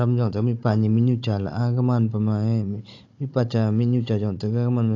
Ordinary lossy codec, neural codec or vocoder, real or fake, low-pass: AAC, 48 kbps; codec, 16 kHz, 16 kbps, FunCodec, trained on Chinese and English, 50 frames a second; fake; 7.2 kHz